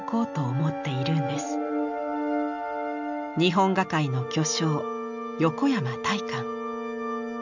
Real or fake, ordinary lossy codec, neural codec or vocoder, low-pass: real; none; none; 7.2 kHz